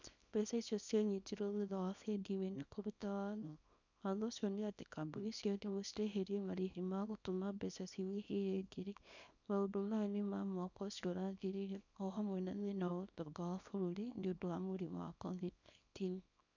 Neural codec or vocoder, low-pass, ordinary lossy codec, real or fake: codec, 24 kHz, 0.9 kbps, WavTokenizer, small release; 7.2 kHz; none; fake